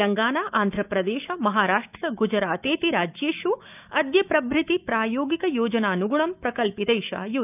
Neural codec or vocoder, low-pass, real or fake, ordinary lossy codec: autoencoder, 48 kHz, 128 numbers a frame, DAC-VAE, trained on Japanese speech; 3.6 kHz; fake; none